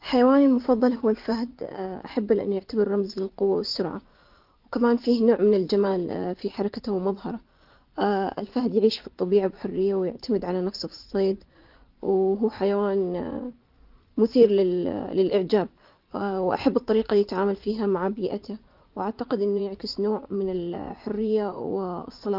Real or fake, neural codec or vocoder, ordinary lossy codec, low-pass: fake; vocoder, 24 kHz, 100 mel bands, Vocos; Opus, 32 kbps; 5.4 kHz